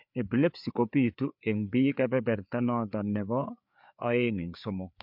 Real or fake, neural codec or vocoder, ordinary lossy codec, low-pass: fake; codec, 16 kHz, 4 kbps, FreqCodec, larger model; MP3, 48 kbps; 5.4 kHz